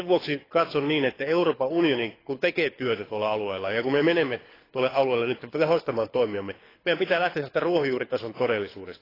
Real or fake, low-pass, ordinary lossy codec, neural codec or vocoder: fake; 5.4 kHz; AAC, 24 kbps; codec, 24 kHz, 6 kbps, HILCodec